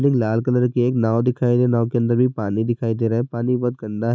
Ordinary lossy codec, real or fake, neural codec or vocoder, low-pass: none; real; none; none